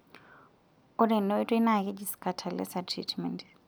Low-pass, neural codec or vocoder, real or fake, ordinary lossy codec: none; none; real; none